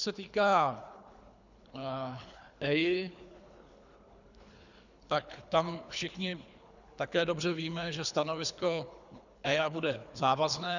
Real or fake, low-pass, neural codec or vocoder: fake; 7.2 kHz; codec, 24 kHz, 3 kbps, HILCodec